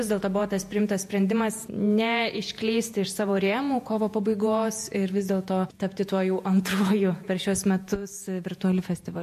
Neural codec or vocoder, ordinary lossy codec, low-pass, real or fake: vocoder, 48 kHz, 128 mel bands, Vocos; MP3, 64 kbps; 14.4 kHz; fake